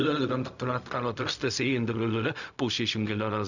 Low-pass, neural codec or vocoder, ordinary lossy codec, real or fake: 7.2 kHz; codec, 16 kHz, 0.4 kbps, LongCat-Audio-Codec; none; fake